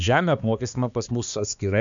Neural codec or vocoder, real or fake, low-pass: codec, 16 kHz, 2 kbps, X-Codec, HuBERT features, trained on balanced general audio; fake; 7.2 kHz